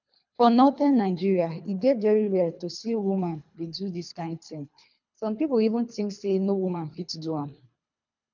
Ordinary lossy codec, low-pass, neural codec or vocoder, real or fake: none; 7.2 kHz; codec, 24 kHz, 3 kbps, HILCodec; fake